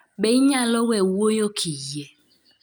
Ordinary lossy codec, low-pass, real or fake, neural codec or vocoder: none; none; real; none